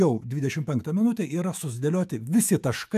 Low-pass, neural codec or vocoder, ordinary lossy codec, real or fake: 14.4 kHz; autoencoder, 48 kHz, 128 numbers a frame, DAC-VAE, trained on Japanese speech; AAC, 96 kbps; fake